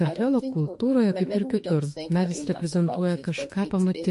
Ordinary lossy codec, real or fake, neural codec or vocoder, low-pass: MP3, 48 kbps; fake; autoencoder, 48 kHz, 32 numbers a frame, DAC-VAE, trained on Japanese speech; 14.4 kHz